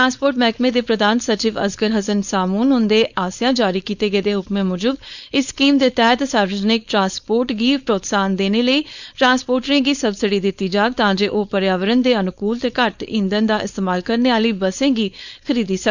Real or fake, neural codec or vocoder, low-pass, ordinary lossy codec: fake; codec, 16 kHz, 4.8 kbps, FACodec; 7.2 kHz; none